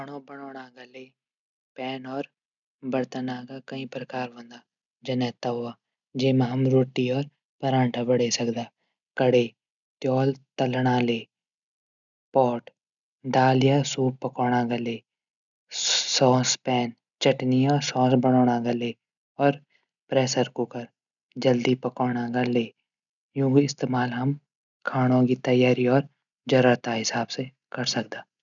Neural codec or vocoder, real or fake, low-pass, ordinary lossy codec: none; real; 7.2 kHz; none